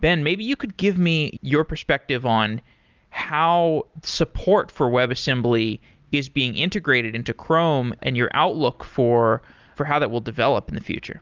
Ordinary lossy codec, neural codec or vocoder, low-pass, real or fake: Opus, 24 kbps; none; 7.2 kHz; real